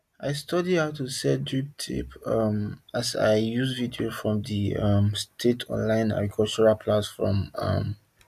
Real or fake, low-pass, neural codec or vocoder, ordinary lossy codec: real; 14.4 kHz; none; none